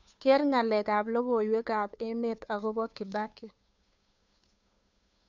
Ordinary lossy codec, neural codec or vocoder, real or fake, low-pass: none; codec, 16 kHz, 2 kbps, FunCodec, trained on Chinese and English, 25 frames a second; fake; 7.2 kHz